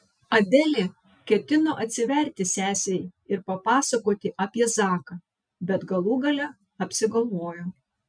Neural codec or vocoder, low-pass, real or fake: none; 9.9 kHz; real